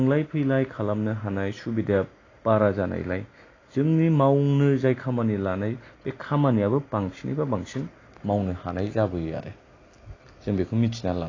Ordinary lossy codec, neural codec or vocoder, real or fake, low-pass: AAC, 32 kbps; none; real; 7.2 kHz